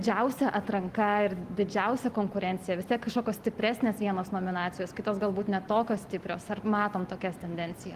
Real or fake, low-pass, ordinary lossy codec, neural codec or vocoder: real; 14.4 kHz; Opus, 24 kbps; none